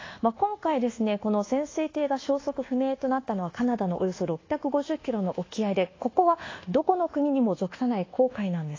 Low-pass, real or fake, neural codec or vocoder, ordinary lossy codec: 7.2 kHz; fake; codec, 24 kHz, 1.2 kbps, DualCodec; AAC, 32 kbps